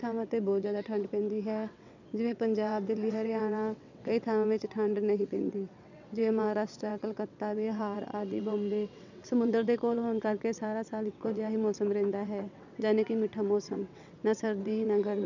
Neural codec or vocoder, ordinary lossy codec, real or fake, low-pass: vocoder, 22.05 kHz, 80 mel bands, WaveNeXt; none; fake; 7.2 kHz